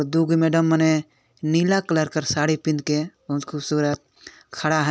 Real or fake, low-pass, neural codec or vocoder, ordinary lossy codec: real; none; none; none